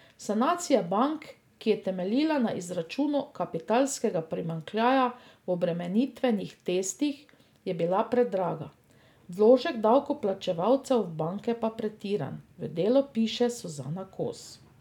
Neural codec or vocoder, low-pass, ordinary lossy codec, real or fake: none; 19.8 kHz; none; real